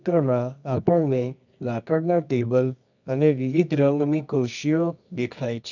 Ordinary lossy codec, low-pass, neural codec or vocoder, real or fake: none; 7.2 kHz; codec, 24 kHz, 0.9 kbps, WavTokenizer, medium music audio release; fake